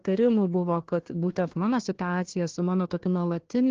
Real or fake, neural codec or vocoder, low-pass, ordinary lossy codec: fake; codec, 16 kHz, 1 kbps, FunCodec, trained on Chinese and English, 50 frames a second; 7.2 kHz; Opus, 16 kbps